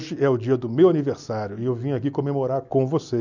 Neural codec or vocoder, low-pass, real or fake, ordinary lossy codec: none; 7.2 kHz; real; none